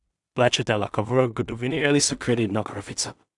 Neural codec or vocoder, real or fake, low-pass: codec, 16 kHz in and 24 kHz out, 0.4 kbps, LongCat-Audio-Codec, two codebook decoder; fake; 10.8 kHz